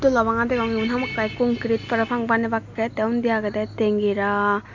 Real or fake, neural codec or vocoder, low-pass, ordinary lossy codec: real; none; 7.2 kHz; MP3, 64 kbps